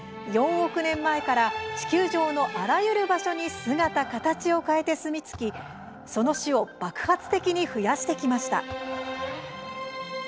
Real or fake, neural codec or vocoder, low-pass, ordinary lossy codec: real; none; none; none